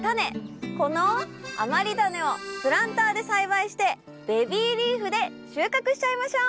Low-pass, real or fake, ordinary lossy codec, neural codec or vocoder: none; real; none; none